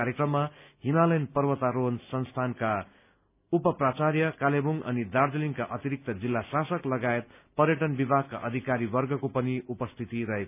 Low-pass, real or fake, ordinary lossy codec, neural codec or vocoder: 3.6 kHz; real; none; none